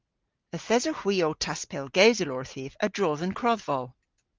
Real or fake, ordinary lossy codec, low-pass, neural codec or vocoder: real; Opus, 32 kbps; 7.2 kHz; none